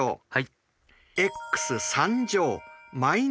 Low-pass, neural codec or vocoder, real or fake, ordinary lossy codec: none; none; real; none